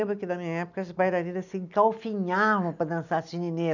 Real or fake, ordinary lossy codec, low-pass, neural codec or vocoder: real; none; 7.2 kHz; none